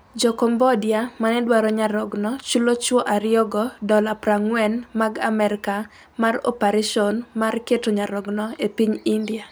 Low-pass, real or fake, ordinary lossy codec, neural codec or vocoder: none; real; none; none